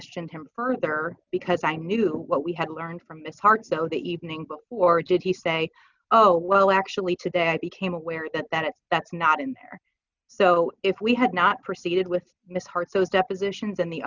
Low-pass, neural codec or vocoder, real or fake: 7.2 kHz; none; real